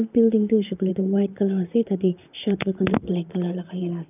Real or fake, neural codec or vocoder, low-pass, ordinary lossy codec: fake; vocoder, 44.1 kHz, 128 mel bands, Pupu-Vocoder; 3.6 kHz; none